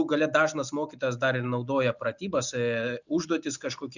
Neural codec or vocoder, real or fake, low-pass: none; real; 7.2 kHz